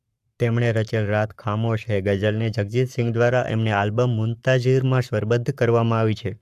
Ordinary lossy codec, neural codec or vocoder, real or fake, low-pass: AAC, 96 kbps; codec, 44.1 kHz, 7.8 kbps, Pupu-Codec; fake; 14.4 kHz